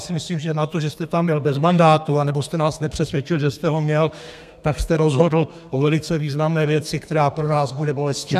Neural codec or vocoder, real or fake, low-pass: codec, 32 kHz, 1.9 kbps, SNAC; fake; 14.4 kHz